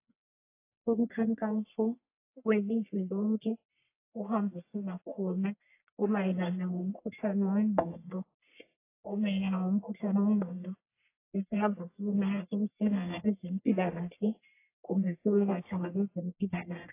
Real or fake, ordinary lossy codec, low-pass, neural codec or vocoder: fake; AAC, 24 kbps; 3.6 kHz; codec, 44.1 kHz, 1.7 kbps, Pupu-Codec